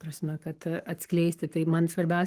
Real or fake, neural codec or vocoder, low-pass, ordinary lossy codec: fake; codec, 44.1 kHz, 7.8 kbps, Pupu-Codec; 14.4 kHz; Opus, 24 kbps